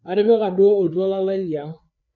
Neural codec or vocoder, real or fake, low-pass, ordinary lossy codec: codec, 16 kHz, 4 kbps, FreqCodec, larger model; fake; 7.2 kHz; none